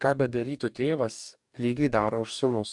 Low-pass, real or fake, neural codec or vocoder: 10.8 kHz; fake; codec, 44.1 kHz, 2.6 kbps, DAC